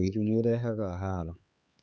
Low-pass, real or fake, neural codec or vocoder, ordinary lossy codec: none; fake; codec, 16 kHz, 4 kbps, X-Codec, HuBERT features, trained on LibriSpeech; none